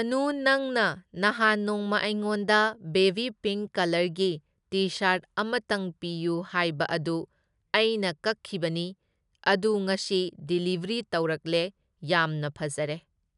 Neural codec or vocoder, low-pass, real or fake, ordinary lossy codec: none; 10.8 kHz; real; none